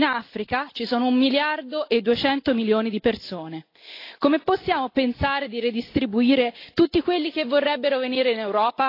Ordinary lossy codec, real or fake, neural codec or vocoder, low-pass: AAC, 32 kbps; real; none; 5.4 kHz